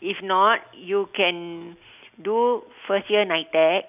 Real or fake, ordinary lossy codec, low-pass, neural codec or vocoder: real; none; 3.6 kHz; none